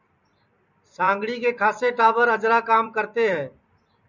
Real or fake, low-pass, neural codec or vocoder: fake; 7.2 kHz; vocoder, 44.1 kHz, 128 mel bands every 256 samples, BigVGAN v2